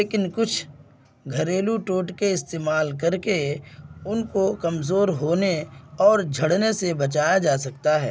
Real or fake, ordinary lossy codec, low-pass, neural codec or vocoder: real; none; none; none